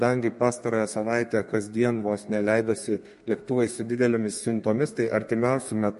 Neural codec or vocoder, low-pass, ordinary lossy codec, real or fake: codec, 32 kHz, 1.9 kbps, SNAC; 14.4 kHz; MP3, 48 kbps; fake